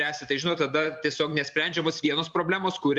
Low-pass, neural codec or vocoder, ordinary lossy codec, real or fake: 10.8 kHz; vocoder, 44.1 kHz, 128 mel bands every 512 samples, BigVGAN v2; Opus, 64 kbps; fake